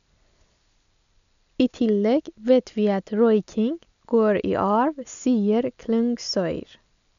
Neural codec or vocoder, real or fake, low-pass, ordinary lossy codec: none; real; 7.2 kHz; none